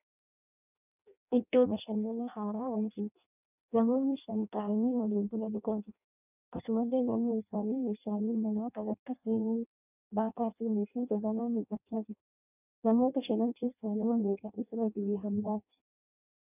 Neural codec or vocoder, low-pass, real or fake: codec, 16 kHz in and 24 kHz out, 0.6 kbps, FireRedTTS-2 codec; 3.6 kHz; fake